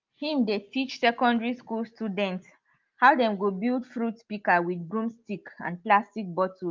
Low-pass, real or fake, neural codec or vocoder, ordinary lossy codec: 7.2 kHz; real; none; Opus, 32 kbps